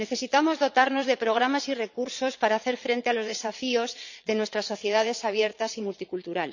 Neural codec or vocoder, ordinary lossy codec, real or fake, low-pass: vocoder, 22.05 kHz, 80 mel bands, Vocos; none; fake; 7.2 kHz